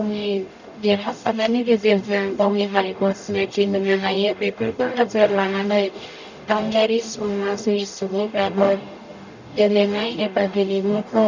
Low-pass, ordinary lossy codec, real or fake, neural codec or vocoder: 7.2 kHz; none; fake; codec, 44.1 kHz, 0.9 kbps, DAC